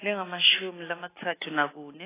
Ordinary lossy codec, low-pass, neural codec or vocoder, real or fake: AAC, 16 kbps; 3.6 kHz; none; real